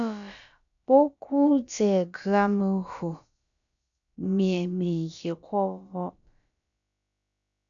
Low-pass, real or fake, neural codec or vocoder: 7.2 kHz; fake; codec, 16 kHz, about 1 kbps, DyCAST, with the encoder's durations